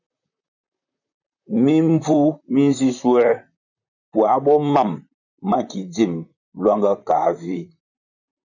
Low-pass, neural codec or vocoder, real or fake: 7.2 kHz; vocoder, 44.1 kHz, 128 mel bands, Pupu-Vocoder; fake